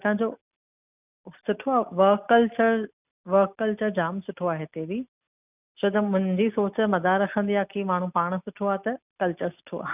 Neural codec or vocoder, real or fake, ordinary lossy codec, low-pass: none; real; none; 3.6 kHz